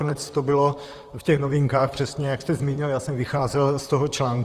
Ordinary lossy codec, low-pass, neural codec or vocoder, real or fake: Opus, 32 kbps; 14.4 kHz; vocoder, 44.1 kHz, 128 mel bands, Pupu-Vocoder; fake